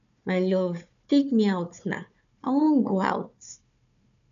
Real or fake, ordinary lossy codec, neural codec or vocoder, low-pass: fake; MP3, 96 kbps; codec, 16 kHz, 4 kbps, FunCodec, trained on Chinese and English, 50 frames a second; 7.2 kHz